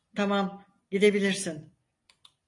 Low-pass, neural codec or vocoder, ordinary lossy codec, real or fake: 10.8 kHz; none; AAC, 48 kbps; real